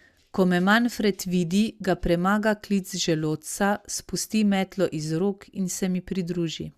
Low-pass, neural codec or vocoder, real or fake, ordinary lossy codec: 14.4 kHz; none; real; Opus, 64 kbps